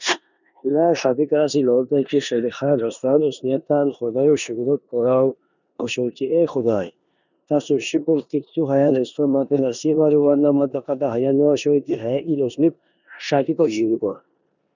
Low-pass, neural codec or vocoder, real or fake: 7.2 kHz; codec, 16 kHz in and 24 kHz out, 0.9 kbps, LongCat-Audio-Codec, four codebook decoder; fake